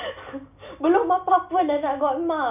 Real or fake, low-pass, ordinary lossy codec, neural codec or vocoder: real; 3.6 kHz; none; none